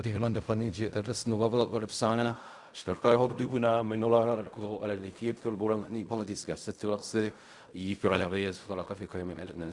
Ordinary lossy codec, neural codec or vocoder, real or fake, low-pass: Opus, 64 kbps; codec, 16 kHz in and 24 kHz out, 0.4 kbps, LongCat-Audio-Codec, fine tuned four codebook decoder; fake; 10.8 kHz